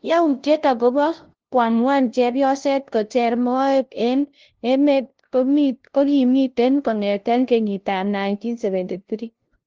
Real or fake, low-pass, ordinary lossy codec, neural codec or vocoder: fake; 7.2 kHz; Opus, 16 kbps; codec, 16 kHz, 0.5 kbps, FunCodec, trained on LibriTTS, 25 frames a second